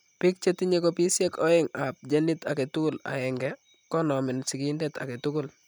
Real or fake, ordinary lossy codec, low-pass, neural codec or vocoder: real; none; 19.8 kHz; none